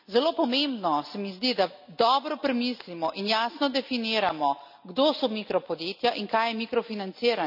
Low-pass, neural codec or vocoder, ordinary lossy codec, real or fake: 5.4 kHz; none; none; real